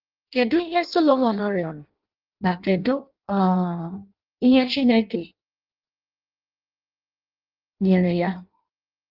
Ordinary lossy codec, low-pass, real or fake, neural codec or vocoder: Opus, 24 kbps; 5.4 kHz; fake; codec, 16 kHz in and 24 kHz out, 0.6 kbps, FireRedTTS-2 codec